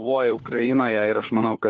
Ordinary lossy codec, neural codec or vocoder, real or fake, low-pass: Opus, 16 kbps; codec, 16 kHz, 4 kbps, FunCodec, trained on LibriTTS, 50 frames a second; fake; 7.2 kHz